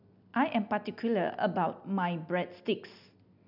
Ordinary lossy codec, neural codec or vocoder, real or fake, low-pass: none; none; real; 5.4 kHz